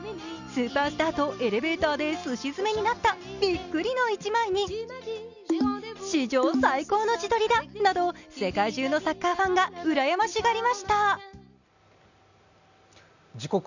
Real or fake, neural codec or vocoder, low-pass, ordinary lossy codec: real; none; 7.2 kHz; none